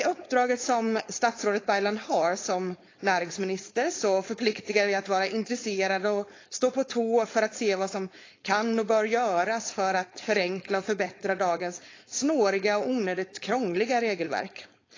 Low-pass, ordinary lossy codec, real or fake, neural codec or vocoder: 7.2 kHz; AAC, 32 kbps; fake; codec, 16 kHz, 4.8 kbps, FACodec